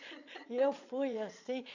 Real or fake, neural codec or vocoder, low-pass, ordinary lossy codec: fake; vocoder, 22.05 kHz, 80 mel bands, Vocos; 7.2 kHz; none